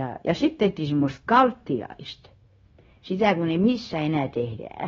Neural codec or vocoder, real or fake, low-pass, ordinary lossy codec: codec, 16 kHz, 0.9 kbps, LongCat-Audio-Codec; fake; 7.2 kHz; AAC, 24 kbps